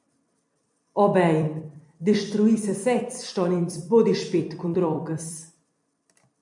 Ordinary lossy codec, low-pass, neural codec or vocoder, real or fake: MP3, 64 kbps; 10.8 kHz; vocoder, 44.1 kHz, 128 mel bands every 256 samples, BigVGAN v2; fake